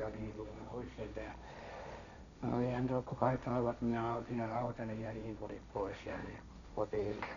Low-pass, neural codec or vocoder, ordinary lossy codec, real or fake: 7.2 kHz; codec, 16 kHz, 1.1 kbps, Voila-Tokenizer; none; fake